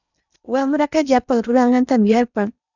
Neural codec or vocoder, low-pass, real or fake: codec, 16 kHz in and 24 kHz out, 0.8 kbps, FocalCodec, streaming, 65536 codes; 7.2 kHz; fake